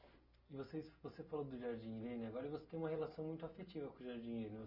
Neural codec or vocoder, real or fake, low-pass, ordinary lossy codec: none; real; 5.4 kHz; none